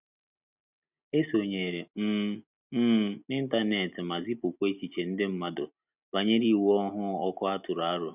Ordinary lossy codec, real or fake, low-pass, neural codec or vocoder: none; real; 3.6 kHz; none